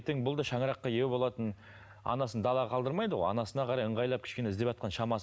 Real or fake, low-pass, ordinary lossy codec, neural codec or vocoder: real; none; none; none